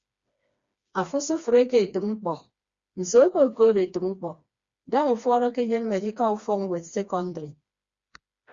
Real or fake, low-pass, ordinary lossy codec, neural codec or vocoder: fake; 7.2 kHz; Opus, 64 kbps; codec, 16 kHz, 2 kbps, FreqCodec, smaller model